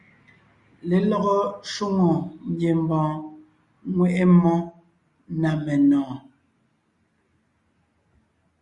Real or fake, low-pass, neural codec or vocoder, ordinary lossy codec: real; 10.8 kHz; none; Opus, 64 kbps